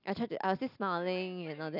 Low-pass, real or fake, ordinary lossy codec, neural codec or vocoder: 5.4 kHz; real; none; none